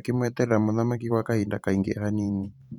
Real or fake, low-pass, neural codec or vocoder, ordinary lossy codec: fake; 19.8 kHz; vocoder, 44.1 kHz, 128 mel bands every 512 samples, BigVGAN v2; none